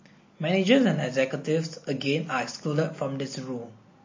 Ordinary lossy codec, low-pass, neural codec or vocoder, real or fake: MP3, 32 kbps; 7.2 kHz; none; real